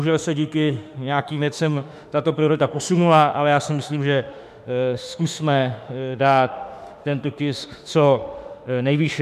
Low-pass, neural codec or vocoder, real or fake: 14.4 kHz; autoencoder, 48 kHz, 32 numbers a frame, DAC-VAE, trained on Japanese speech; fake